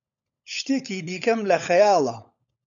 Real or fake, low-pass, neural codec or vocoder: fake; 7.2 kHz; codec, 16 kHz, 16 kbps, FunCodec, trained on LibriTTS, 50 frames a second